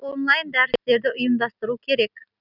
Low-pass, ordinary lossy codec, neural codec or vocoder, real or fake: 5.4 kHz; none; none; real